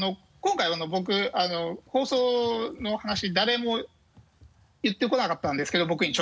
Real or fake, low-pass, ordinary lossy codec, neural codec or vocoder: real; none; none; none